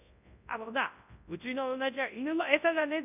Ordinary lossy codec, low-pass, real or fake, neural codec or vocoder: none; 3.6 kHz; fake; codec, 24 kHz, 0.9 kbps, WavTokenizer, large speech release